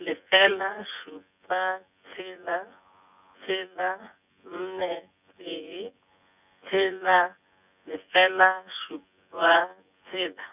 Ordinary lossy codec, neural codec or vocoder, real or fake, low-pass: none; vocoder, 24 kHz, 100 mel bands, Vocos; fake; 3.6 kHz